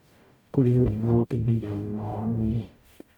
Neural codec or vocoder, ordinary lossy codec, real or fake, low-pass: codec, 44.1 kHz, 0.9 kbps, DAC; none; fake; 19.8 kHz